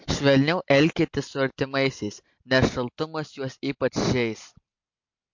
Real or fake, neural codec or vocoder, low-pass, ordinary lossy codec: real; none; 7.2 kHz; MP3, 48 kbps